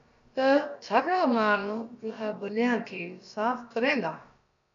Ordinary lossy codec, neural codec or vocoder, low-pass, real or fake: MP3, 64 kbps; codec, 16 kHz, about 1 kbps, DyCAST, with the encoder's durations; 7.2 kHz; fake